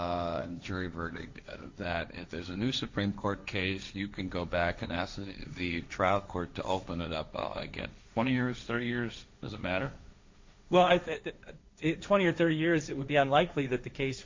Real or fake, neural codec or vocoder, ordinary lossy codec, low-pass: fake; codec, 16 kHz, 1.1 kbps, Voila-Tokenizer; MP3, 48 kbps; 7.2 kHz